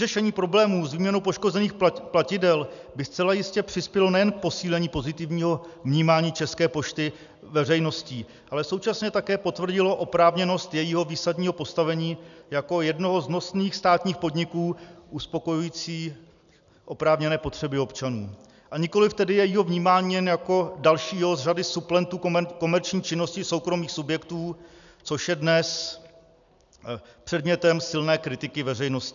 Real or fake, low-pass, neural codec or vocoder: real; 7.2 kHz; none